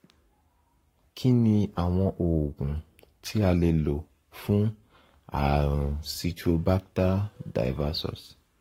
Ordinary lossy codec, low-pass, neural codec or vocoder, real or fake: AAC, 48 kbps; 19.8 kHz; codec, 44.1 kHz, 7.8 kbps, Pupu-Codec; fake